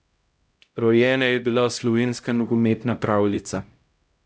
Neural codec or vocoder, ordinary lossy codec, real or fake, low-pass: codec, 16 kHz, 0.5 kbps, X-Codec, HuBERT features, trained on LibriSpeech; none; fake; none